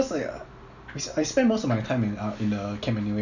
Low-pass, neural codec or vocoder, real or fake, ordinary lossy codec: 7.2 kHz; none; real; none